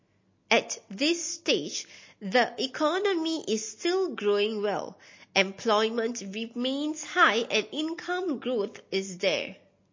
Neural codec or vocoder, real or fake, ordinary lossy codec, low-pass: none; real; MP3, 32 kbps; 7.2 kHz